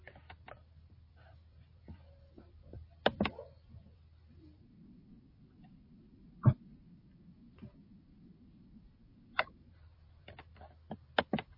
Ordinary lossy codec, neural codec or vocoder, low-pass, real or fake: MP3, 24 kbps; vocoder, 44.1 kHz, 128 mel bands every 256 samples, BigVGAN v2; 5.4 kHz; fake